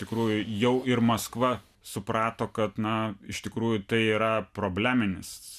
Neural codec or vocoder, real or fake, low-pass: none; real; 14.4 kHz